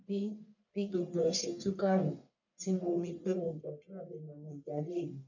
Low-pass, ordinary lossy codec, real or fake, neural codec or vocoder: 7.2 kHz; none; fake; codec, 44.1 kHz, 1.7 kbps, Pupu-Codec